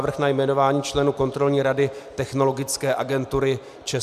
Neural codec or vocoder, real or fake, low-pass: none; real; 14.4 kHz